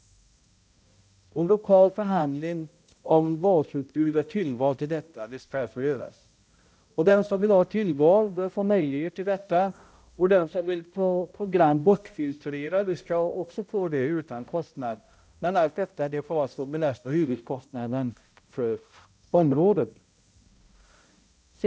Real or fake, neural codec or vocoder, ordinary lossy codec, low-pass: fake; codec, 16 kHz, 0.5 kbps, X-Codec, HuBERT features, trained on balanced general audio; none; none